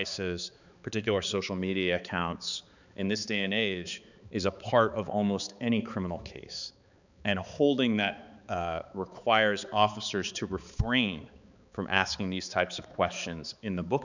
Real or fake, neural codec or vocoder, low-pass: fake; codec, 16 kHz, 4 kbps, X-Codec, HuBERT features, trained on balanced general audio; 7.2 kHz